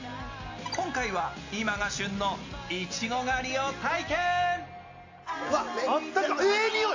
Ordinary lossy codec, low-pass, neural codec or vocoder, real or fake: AAC, 48 kbps; 7.2 kHz; none; real